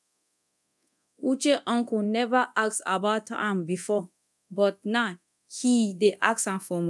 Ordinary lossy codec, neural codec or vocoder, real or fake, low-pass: none; codec, 24 kHz, 0.9 kbps, DualCodec; fake; none